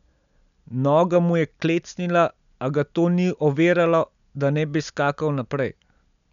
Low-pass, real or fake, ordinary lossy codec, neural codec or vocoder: 7.2 kHz; real; none; none